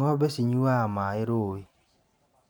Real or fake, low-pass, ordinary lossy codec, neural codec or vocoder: fake; none; none; vocoder, 44.1 kHz, 128 mel bands every 512 samples, BigVGAN v2